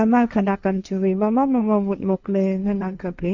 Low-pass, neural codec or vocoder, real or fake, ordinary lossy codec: 7.2 kHz; codec, 16 kHz, 1.1 kbps, Voila-Tokenizer; fake; none